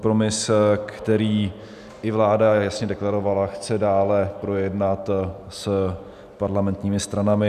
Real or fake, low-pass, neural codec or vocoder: real; 14.4 kHz; none